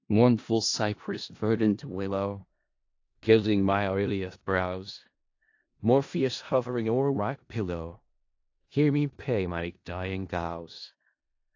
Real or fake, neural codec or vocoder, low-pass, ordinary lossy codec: fake; codec, 16 kHz in and 24 kHz out, 0.4 kbps, LongCat-Audio-Codec, four codebook decoder; 7.2 kHz; AAC, 48 kbps